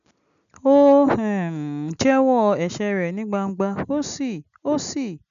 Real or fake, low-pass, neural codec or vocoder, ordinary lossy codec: real; 7.2 kHz; none; none